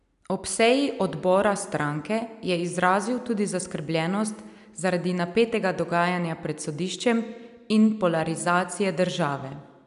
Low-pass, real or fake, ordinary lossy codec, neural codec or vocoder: 10.8 kHz; real; none; none